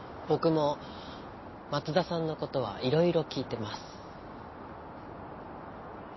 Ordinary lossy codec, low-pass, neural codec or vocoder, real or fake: MP3, 24 kbps; 7.2 kHz; none; real